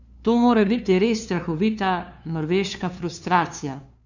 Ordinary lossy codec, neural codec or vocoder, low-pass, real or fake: none; codec, 16 kHz, 2 kbps, FunCodec, trained on LibriTTS, 25 frames a second; 7.2 kHz; fake